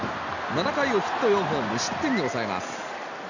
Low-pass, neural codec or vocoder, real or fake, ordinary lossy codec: 7.2 kHz; none; real; none